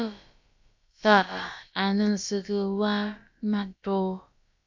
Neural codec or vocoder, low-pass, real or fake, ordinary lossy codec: codec, 16 kHz, about 1 kbps, DyCAST, with the encoder's durations; 7.2 kHz; fake; MP3, 64 kbps